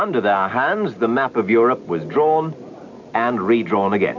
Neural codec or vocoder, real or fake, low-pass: none; real; 7.2 kHz